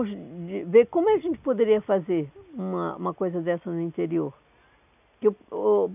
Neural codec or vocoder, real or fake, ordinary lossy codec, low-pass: none; real; none; 3.6 kHz